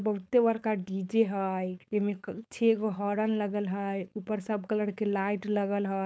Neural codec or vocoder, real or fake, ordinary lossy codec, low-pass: codec, 16 kHz, 4.8 kbps, FACodec; fake; none; none